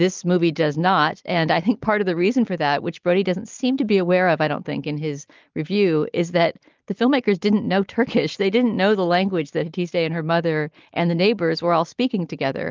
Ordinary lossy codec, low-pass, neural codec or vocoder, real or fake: Opus, 24 kbps; 7.2 kHz; none; real